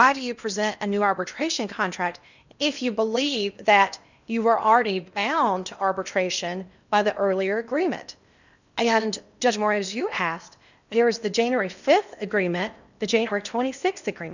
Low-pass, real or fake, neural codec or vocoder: 7.2 kHz; fake; codec, 16 kHz in and 24 kHz out, 0.8 kbps, FocalCodec, streaming, 65536 codes